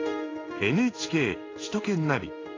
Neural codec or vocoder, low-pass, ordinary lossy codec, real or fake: codec, 16 kHz in and 24 kHz out, 1 kbps, XY-Tokenizer; 7.2 kHz; AAC, 32 kbps; fake